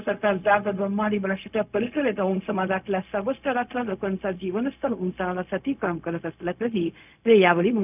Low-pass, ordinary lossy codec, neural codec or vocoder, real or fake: 3.6 kHz; none; codec, 16 kHz, 0.4 kbps, LongCat-Audio-Codec; fake